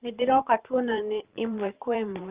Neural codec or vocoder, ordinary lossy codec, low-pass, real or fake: vocoder, 44.1 kHz, 128 mel bands every 512 samples, BigVGAN v2; Opus, 24 kbps; 3.6 kHz; fake